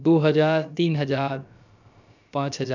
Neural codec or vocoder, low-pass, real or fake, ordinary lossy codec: codec, 16 kHz, about 1 kbps, DyCAST, with the encoder's durations; 7.2 kHz; fake; none